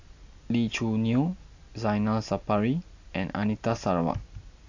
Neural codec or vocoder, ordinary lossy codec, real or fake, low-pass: none; AAC, 48 kbps; real; 7.2 kHz